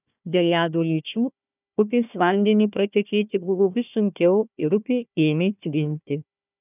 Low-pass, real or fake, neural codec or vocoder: 3.6 kHz; fake; codec, 16 kHz, 1 kbps, FunCodec, trained on Chinese and English, 50 frames a second